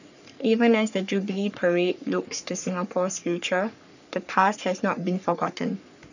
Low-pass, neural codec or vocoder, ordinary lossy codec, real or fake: 7.2 kHz; codec, 44.1 kHz, 3.4 kbps, Pupu-Codec; none; fake